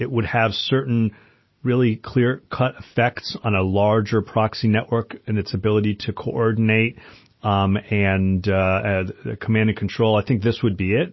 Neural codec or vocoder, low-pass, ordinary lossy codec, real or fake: none; 7.2 kHz; MP3, 24 kbps; real